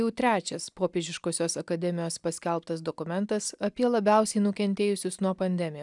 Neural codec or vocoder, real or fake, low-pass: none; real; 10.8 kHz